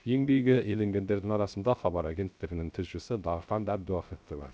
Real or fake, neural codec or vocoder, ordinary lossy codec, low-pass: fake; codec, 16 kHz, 0.3 kbps, FocalCodec; none; none